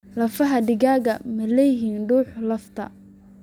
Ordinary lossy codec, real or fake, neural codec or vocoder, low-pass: none; real; none; 19.8 kHz